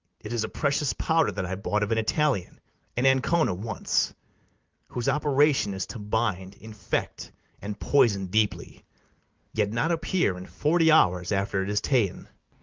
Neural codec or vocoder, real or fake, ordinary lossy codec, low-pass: none; real; Opus, 32 kbps; 7.2 kHz